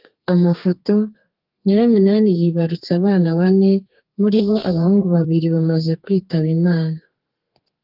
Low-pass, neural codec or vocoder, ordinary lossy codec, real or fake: 5.4 kHz; codec, 32 kHz, 1.9 kbps, SNAC; Opus, 24 kbps; fake